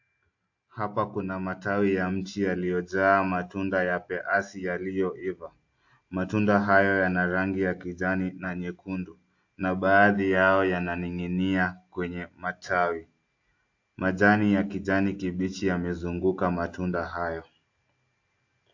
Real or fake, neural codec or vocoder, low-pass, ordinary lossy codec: real; none; 7.2 kHz; AAC, 48 kbps